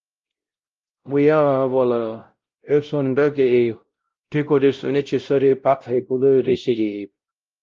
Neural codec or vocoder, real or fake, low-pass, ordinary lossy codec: codec, 16 kHz, 0.5 kbps, X-Codec, WavLM features, trained on Multilingual LibriSpeech; fake; 7.2 kHz; Opus, 32 kbps